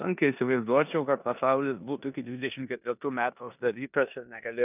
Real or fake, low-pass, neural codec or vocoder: fake; 3.6 kHz; codec, 16 kHz in and 24 kHz out, 0.9 kbps, LongCat-Audio-Codec, four codebook decoder